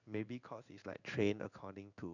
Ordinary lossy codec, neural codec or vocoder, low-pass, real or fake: none; codec, 16 kHz in and 24 kHz out, 1 kbps, XY-Tokenizer; 7.2 kHz; fake